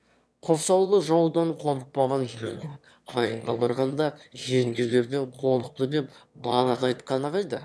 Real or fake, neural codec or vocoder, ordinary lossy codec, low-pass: fake; autoencoder, 22.05 kHz, a latent of 192 numbers a frame, VITS, trained on one speaker; none; none